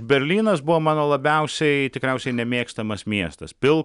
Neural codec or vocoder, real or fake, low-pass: none; real; 10.8 kHz